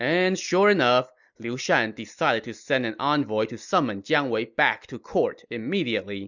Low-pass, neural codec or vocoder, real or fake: 7.2 kHz; none; real